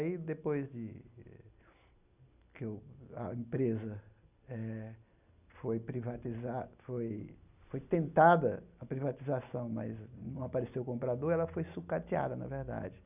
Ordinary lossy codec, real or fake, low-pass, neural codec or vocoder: none; real; 3.6 kHz; none